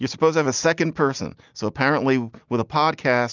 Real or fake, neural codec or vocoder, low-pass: real; none; 7.2 kHz